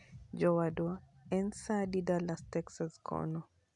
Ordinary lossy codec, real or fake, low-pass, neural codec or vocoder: none; real; 10.8 kHz; none